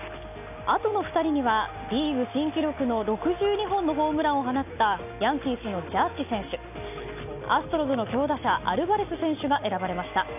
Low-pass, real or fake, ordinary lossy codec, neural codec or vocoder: 3.6 kHz; real; none; none